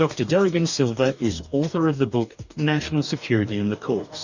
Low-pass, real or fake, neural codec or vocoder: 7.2 kHz; fake; codec, 44.1 kHz, 2.6 kbps, DAC